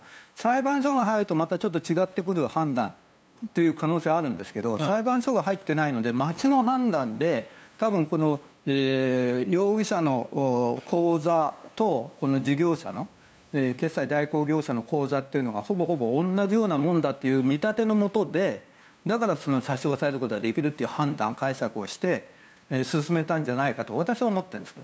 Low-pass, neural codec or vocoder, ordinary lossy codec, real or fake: none; codec, 16 kHz, 2 kbps, FunCodec, trained on LibriTTS, 25 frames a second; none; fake